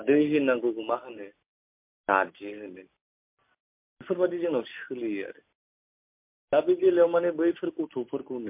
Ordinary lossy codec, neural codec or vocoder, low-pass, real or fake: MP3, 32 kbps; none; 3.6 kHz; real